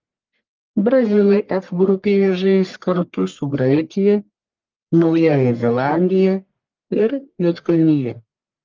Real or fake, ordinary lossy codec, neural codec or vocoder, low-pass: fake; Opus, 32 kbps; codec, 44.1 kHz, 1.7 kbps, Pupu-Codec; 7.2 kHz